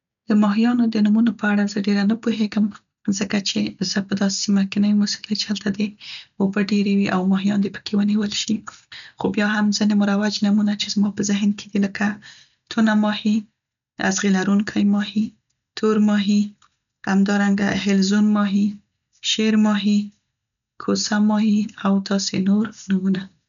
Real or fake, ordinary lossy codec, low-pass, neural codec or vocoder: real; none; 7.2 kHz; none